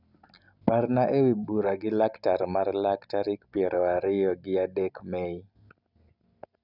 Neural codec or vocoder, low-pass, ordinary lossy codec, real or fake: none; 5.4 kHz; none; real